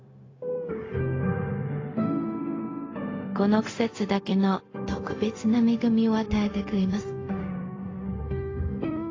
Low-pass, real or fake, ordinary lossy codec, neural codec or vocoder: 7.2 kHz; fake; AAC, 32 kbps; codec, 16 kHz, 0.4 kbps, LongCat-Audio-Codec